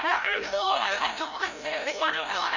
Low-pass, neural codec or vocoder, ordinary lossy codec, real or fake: 7.2 kHz; codec, 16 kHz, 0.5 kbps, FreqCodec, larger model; none; fake